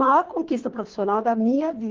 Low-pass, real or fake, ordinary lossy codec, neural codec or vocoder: 7.2 kHz; fake; Opus, 16 kbps; codec, 24 kHz, 3 kbps, HILCodec